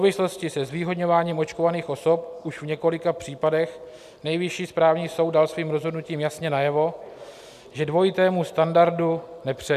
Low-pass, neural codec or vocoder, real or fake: 14.4 kHz; none; real